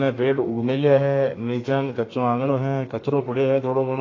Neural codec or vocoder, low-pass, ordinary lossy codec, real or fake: codec, 32 kHz, 1.9 kbps, SNAC; 7.2 kHz; AAC, 32 kbps; fake